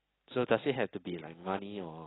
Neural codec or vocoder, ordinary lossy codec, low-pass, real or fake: none; AAC, 16 kbps; 7.2 kHz; real